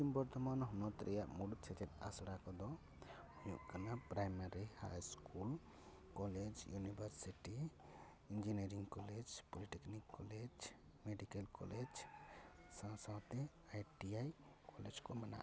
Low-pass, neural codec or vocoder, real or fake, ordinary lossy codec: none; none; real; none